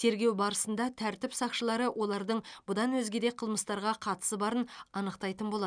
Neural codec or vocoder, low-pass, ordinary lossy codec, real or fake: none; 9.9 kHz; none; real